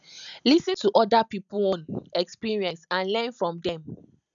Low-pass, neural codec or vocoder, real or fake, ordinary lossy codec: 7.2 kHz; none; real; none